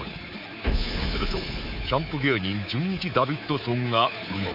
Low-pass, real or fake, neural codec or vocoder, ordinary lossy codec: 5.4 kHz; fake; codec, 24 kHz, 3.1 kbps, DualCodec; none